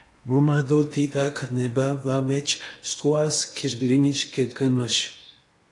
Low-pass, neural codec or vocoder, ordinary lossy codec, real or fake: 10.8 kHz; codec, 16 kHz in and 24 kHz out, 0.8 kbps, FocalCodec, streaming, 65536 codes; AAC, 48 kbps; fake